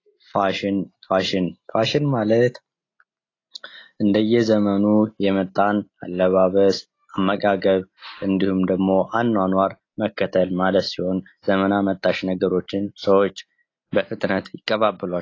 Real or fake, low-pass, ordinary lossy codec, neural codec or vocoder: real; 7.2 kHz; AAC, 32 kbps; none